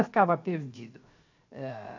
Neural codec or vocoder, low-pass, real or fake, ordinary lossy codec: codec, 16 kHz, 0.7 kbps, FocalCodec; 7.2 kHz; fake; AAC, 48 kbps